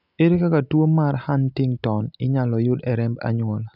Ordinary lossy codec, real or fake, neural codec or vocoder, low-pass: none; real; none; 5.4 kHz